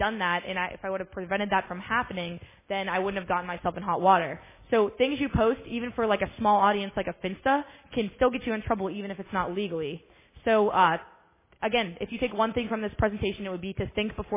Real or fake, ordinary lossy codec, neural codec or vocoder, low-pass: real; MP3, 16 kbps; none; 3.6 kHz